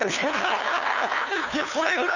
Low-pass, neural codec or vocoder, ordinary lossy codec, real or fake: 7.2 kHz; codec, 16 kHz, 2 kbps, FunCodec, trained on LibriTTS, 25 frames a second; none; fake